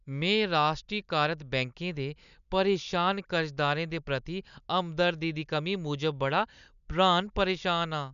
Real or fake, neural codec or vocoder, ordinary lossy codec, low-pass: real; none; none; 7.2 kHz